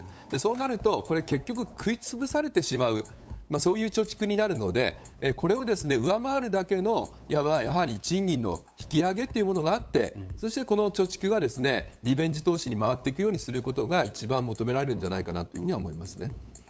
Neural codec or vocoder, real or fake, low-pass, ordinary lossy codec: codec, 16 kHz, 8 kbps, FunCodec, trained on LibriTTS, 25 frames a second; fake; none; none